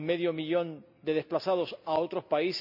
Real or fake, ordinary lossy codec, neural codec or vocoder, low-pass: real; none; none; 5.4 kHz